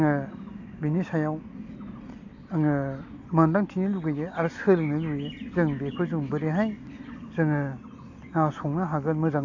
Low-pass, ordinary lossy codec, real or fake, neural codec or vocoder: 7.2 kHz; AAC, 48 kbps; real; none